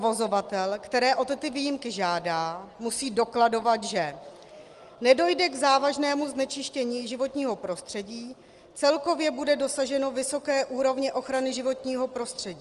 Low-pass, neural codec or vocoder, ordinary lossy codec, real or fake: 10.8 kHz; none; Opus, 24 kbps; real